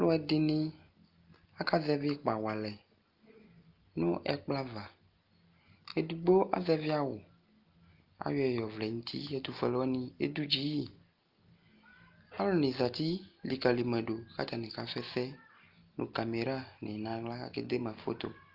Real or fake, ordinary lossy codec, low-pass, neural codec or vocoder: real; Opus, 32 kbps; 5.4 kHz; none